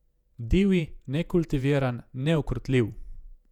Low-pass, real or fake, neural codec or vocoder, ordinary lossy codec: 19.8 kHz; fake; vocoder, 48 kHz, 128 mel bands, Vocos; none